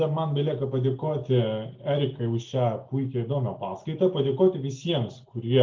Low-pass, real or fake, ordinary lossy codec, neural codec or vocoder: 7.2 kHz; real; Opus, 32 kbps; none